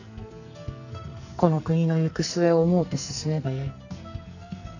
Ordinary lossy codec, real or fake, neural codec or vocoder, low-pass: none; fake; codec, 44.1 kHz, 2.6 kbps, SNAC; 7.2 kHz